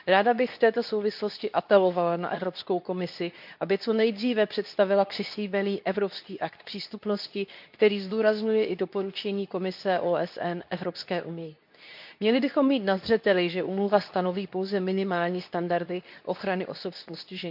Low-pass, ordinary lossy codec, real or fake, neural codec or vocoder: 5.4 kHz; none; fake; codec, 24 kHz, 0.9 kbps, WavTokenizer, medium speech release version 2